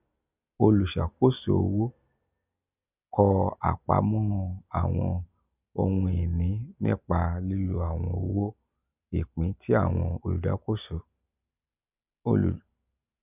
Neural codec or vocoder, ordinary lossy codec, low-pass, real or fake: none; none; 3.6 kHz; real